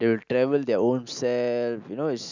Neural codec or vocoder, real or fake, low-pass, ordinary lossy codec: none; real; 7.2 kHz; none